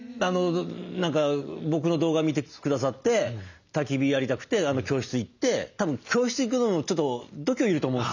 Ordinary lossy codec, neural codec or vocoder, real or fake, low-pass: none; none; real; 7.2 kHz